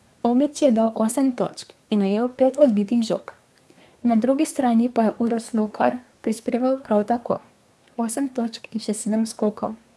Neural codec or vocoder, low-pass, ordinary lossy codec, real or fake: codec, 24 kHz, 1 kbps, SNAC; none; none; fake